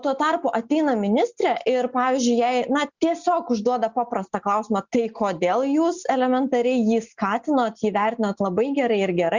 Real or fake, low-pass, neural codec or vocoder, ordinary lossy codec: real; 7.2 kHz; none; Opus, 64 kbps